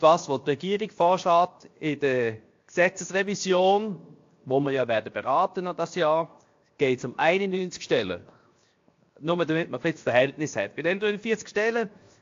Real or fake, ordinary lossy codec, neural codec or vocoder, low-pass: fake; AAC, 48 kbps; codec, 16 kHz, 0.7 kbps, FocalCodec; 7.2 kHz